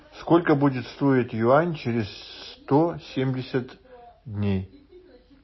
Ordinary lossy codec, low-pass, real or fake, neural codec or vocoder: MP3, 24 kbps; 7.2 kHz; real; none